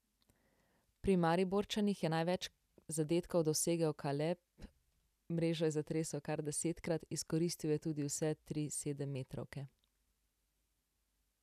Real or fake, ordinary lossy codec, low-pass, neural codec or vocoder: real; none; 14.4 kHz; none